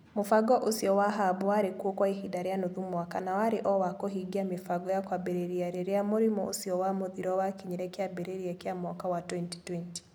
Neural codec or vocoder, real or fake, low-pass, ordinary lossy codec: none; real; none; none